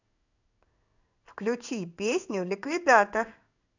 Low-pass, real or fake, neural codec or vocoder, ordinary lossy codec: 7.2 kHz; fake; codec, 16 kHz in and 24 kHz out, 1 kbps, XY-Tokenizer; none